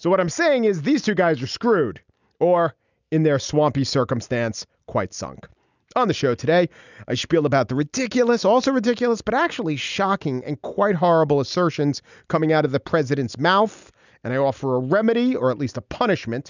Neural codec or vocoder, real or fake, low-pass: none; real; 7.2 kHz